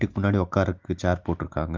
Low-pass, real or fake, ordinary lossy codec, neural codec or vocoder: 7.2 kHz; real; Opus, 24 kbps; none